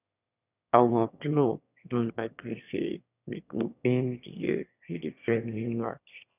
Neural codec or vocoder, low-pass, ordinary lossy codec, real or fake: autoencoder, 22.05 kHz, a latent of 192 numbers a frame, VITS, trained on one speaker; 3.6 kHz; none; fake